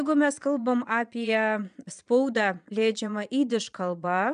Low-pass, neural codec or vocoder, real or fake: 9.9 kHz; vocoder, 22.05 kHz, 80 mel bands, Vocos; fake